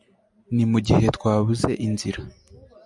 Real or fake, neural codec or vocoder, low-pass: real; none; 10.8 kHz